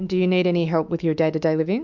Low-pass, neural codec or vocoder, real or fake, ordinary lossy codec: 7.2 kHz; autoencoder, 48 kHz, 128 numbers a frame, DAC-VAE, trained on Japanese speech; fake; MP3, 64 kbps